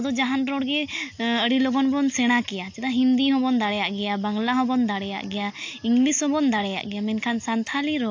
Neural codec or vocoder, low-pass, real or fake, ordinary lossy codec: none; 7.2 kHz; real; AAC, 48 kbps